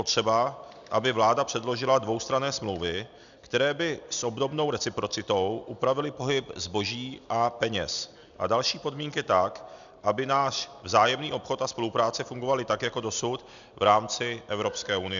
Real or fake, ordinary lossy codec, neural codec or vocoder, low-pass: real; MP3, 96 kbps; none; 7.2 kHz